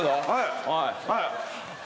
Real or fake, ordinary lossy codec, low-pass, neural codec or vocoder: real; none; none; none